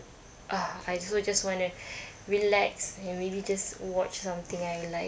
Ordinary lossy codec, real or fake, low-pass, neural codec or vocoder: none; real; none; none